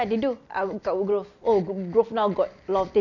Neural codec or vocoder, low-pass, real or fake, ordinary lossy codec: none; 7.2 kHz; real; none